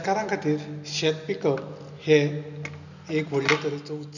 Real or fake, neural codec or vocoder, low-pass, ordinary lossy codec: real; none; 7.2 kHz; none